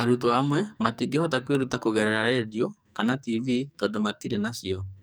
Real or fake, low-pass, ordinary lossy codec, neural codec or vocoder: fake; none; none; codec, 44.1 kHz, 2.6 kbps, SNAC